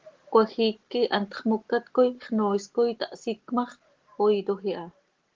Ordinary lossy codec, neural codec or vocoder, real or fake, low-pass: Opus, 16 kbps; none; real; 7.2 kHz